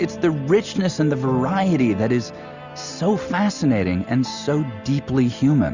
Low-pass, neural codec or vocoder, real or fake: 7.2 kHz; none; real